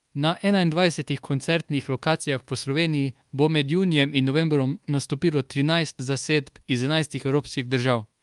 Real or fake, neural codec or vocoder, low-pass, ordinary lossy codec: fake; codec, 24 kHz, 1.2 kbps, DualCodec; 10.8 kHz; Opus, 32 kbps